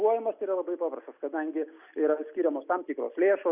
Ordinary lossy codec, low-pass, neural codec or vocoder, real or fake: Opus, 64 kbps; 3.6 kHz; none; real